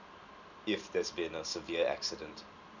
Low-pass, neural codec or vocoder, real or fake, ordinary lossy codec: 7.2 kHz; none; real; none